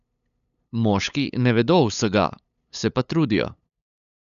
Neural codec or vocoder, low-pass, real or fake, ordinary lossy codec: codec, 16 kHz, 8 kbps, FunCodec, trained on LibriTTS, 25 frames a second; 7.2 kHz; fake; none